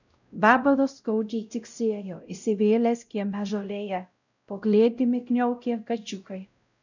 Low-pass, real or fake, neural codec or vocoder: 7.2 kHz; fake; codec, 16 kHz, 0.5 kbps, X-Codec, WavLM features, trained on Multilingual LibriSpeech